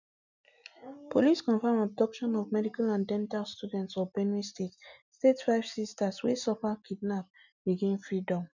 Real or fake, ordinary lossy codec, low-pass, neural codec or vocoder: real; none; 7.2 kHz; none